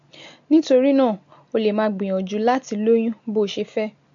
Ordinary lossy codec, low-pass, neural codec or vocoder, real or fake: MP3, 48 kbps; 7.2 kHz; none; real